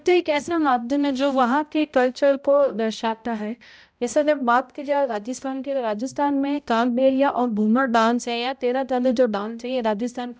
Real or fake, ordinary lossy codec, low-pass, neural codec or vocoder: fake; none; none; codec, 16 kHz, 0.5 kbps, X-Codec, HuBERT features, trained on balanced general audio